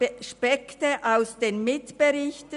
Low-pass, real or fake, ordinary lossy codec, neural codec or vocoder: 10.8 kHz; real; none; none